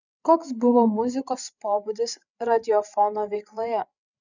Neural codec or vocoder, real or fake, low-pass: codec, 16 kHz, 8 kbps, FreqCodec, larger model; fake; 7.2 kHz